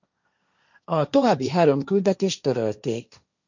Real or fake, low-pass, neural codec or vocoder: fake; 7.2 kHz; codec, 16 kHz, 1.1 kbps, Voila-Tokenizer